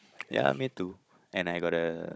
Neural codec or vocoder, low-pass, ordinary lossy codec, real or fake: codec, 16 kHz, 16 kbps, FunCodec, trained on Chinese and English, 50 frames a second; none; none; fake